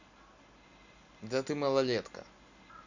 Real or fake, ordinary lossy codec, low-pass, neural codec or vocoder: real; Opus, 64 kbps; 7.2 kHz; none